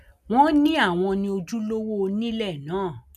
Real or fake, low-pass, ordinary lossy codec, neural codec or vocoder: real; 14.4 kHz; none; none